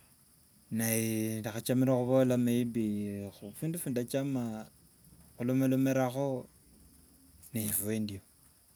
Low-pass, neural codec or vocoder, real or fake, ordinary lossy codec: none; none; real; none